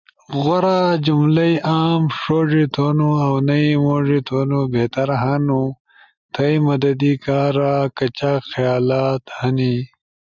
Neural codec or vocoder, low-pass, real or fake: none; 7.2 kHz; real